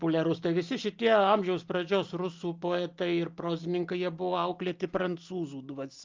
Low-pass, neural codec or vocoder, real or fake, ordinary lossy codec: 7.2 kHz; none; real; Opus, 32 kbps